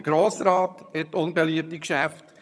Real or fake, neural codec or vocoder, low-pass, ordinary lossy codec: fake; vocoder, 22.05 kHz, 80 mel bands, HiFi-GAN; none; none